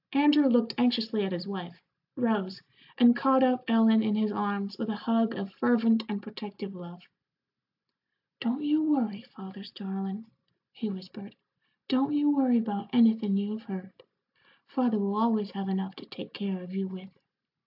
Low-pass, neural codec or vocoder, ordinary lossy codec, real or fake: 5.4 kHz; none; AAC, 48 kbps; real